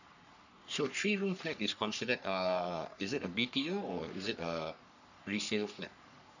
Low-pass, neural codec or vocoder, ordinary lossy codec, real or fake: 7.2 kHz; codec, 44.1 kHz, 3.4 kbps, Pupu-Codec; none; fake